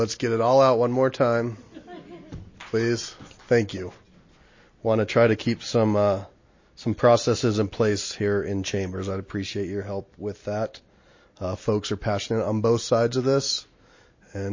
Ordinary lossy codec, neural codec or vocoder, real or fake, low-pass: MP3, 32 kbps; none; real; 7.2 kHz